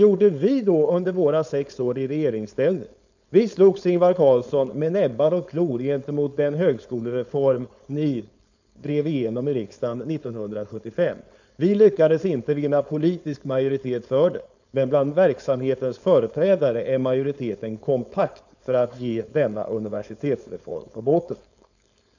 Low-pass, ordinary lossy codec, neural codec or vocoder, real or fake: 7.2 kHz; none; codec, 16 kHz, 4.8 kbps, FACodec; fake